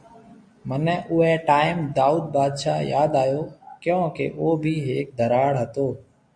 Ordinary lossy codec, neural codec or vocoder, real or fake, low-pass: MP3, 64 kbps; none; real; 9.9 kHz